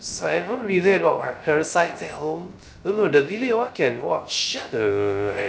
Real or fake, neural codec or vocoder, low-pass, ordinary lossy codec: fake; codec, 16 kHz, 0.3 kbps, FocalCodec; none; none